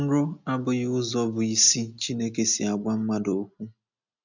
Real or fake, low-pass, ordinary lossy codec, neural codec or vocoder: real; 7.2 kHz; none; none